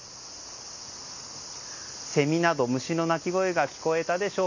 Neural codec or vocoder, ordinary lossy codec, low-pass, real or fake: none; none; 7.2 kHz; real